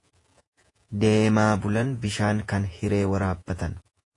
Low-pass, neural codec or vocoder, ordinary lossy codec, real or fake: 10.8 kHz; vocoder, 48 kHz, 128 mel bands, Vocos; AAC, 48 kbps; fake